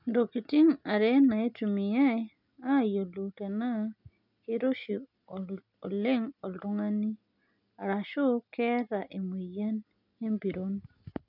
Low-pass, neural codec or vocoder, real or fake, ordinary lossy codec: 5.4 kHz; none; real; none